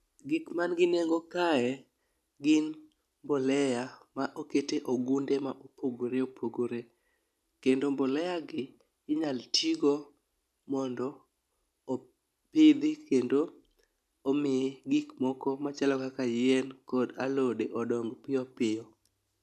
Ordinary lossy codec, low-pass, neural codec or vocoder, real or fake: none; 14.4 kHz; none; real